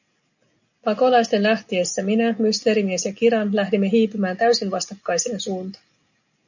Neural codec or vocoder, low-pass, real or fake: none; 7.2 kHz; real